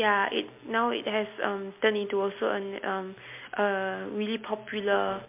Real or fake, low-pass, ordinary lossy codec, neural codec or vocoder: real; 3.6 kHz; MP3, 24 kbps; none